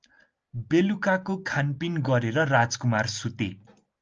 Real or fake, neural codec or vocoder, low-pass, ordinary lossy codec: real; none; 7.2 kHz; Opus, 24 kbps